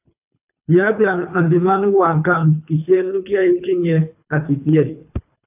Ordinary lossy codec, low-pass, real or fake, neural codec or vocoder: AAC, 32 kbps; 3.6 kHz; fake; codec, 24 kHz, 3 kbps, HILCodec